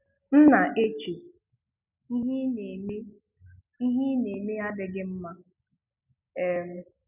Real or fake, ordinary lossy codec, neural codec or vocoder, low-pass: real; none; none; 3.6 kHz